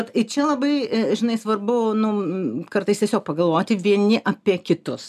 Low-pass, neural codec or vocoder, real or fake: 14.4 kHz; none; real